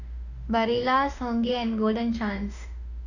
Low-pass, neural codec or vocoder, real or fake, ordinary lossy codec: 7.2 kHz; autoencoder, 48 kHz, 32 numbers a frame, DAC-VAE, trained on Japanese speech; fake; none